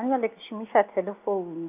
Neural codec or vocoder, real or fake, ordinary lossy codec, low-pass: none; real; none; 3.6 kHz